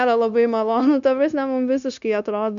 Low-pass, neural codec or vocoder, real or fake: 7.2 kHz; codec, 16 kHz, 0.9 kbps, LongCat-Audio-Codec; fake